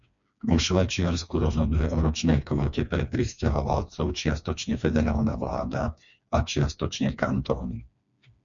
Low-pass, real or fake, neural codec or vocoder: 7.2 kHz; fake; codec, 16 kHz, 2 kbps, FreqCodec, smaller model